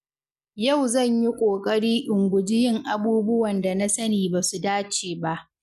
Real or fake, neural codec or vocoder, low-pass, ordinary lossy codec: real; none; 14.4 kHz; none